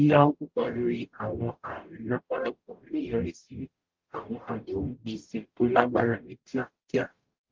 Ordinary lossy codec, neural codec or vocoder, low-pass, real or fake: Opus, 32 kbps; codec, 44.1 kHz, 0.9 kbps, DAC; 7.2 kHz; fake